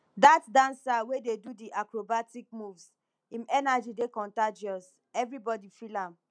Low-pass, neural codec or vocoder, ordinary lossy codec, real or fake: 9.9 kHz; none; none; real